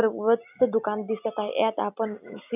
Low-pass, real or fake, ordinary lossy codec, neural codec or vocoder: 3.6 kHz; real; none; none